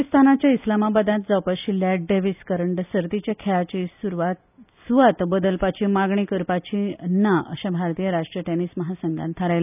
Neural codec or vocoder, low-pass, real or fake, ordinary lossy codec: none; 3.6 kHz; real; none